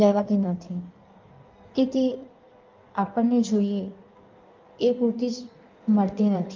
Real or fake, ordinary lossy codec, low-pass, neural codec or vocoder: fake; Opus, 24 kbps; 7.2 kHz; codec, 16 kHz in and 24 kHz out, 1.1 kbps, FireRedTTS-2 codec